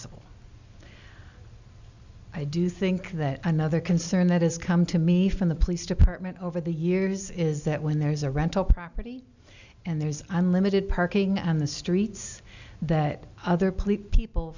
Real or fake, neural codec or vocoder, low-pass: real; none; 7.2 kHz